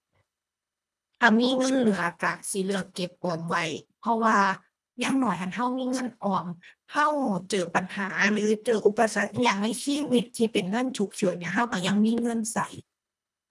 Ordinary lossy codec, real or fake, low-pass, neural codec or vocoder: none; fake; none; codec, 24 kHz, 1.5 kbps, HILCodec